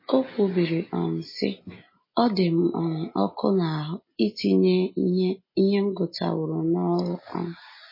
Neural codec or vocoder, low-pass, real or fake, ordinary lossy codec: none; 5.4 kHz; real; MP3, 24 kbps